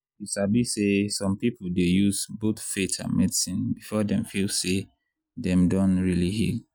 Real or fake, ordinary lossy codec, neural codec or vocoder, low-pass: real; none; none; none